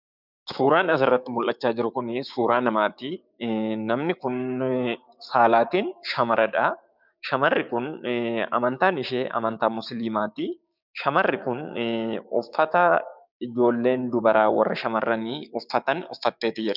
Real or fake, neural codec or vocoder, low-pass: fake; codec, 16 kHz, 6 kbps, DAC; 5.4 kHz